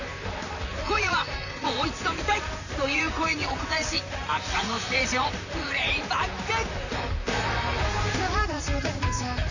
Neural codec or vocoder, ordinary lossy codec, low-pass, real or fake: vocoder, 44.1 kHz, 128 mel bands, Pupu-Vocoder; none; 7.2 kHz; fake